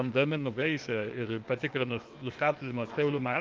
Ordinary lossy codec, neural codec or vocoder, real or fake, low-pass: Opus, 24 kbps; codec, 16 kHz, 0.8 kbps, ZipCodec; fake; 7.2 kHz